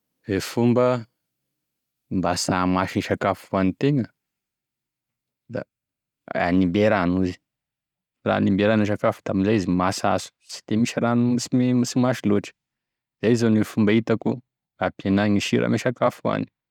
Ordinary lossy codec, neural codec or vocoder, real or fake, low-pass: none; none; real; 19.8 kHz